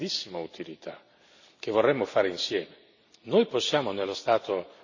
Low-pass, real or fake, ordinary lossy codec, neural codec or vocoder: 7.2 kHz; real; none; none